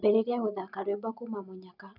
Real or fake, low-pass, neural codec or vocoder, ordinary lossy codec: real; 5.4 kHz; none; none